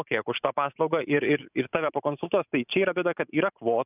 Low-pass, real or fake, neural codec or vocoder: 3.6 kHz; fake; vocoder, 44.1 kHz, 128 mel bands every 512 samples, BigVGAN v2